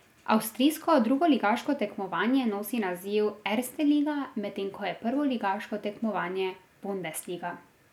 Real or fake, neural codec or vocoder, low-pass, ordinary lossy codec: real; none; 19.8 kHz; none